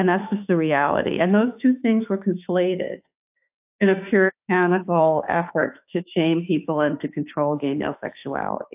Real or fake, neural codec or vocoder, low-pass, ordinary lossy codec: fake; autoencoder, 48 kHz, 32 numbers a frame, DAC-VAE, trained on Japanese speech; 3.6 kHz; AAC, 32 kbps